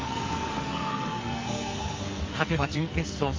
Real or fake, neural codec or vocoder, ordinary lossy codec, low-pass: fake; codec, 44.1 kHz, 2.6 kbps, SNAC; Opus, 32 kbps; 7.2 kHz